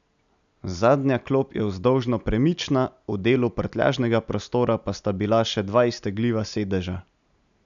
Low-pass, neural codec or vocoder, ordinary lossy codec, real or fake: 7.2 kHz; none; none; real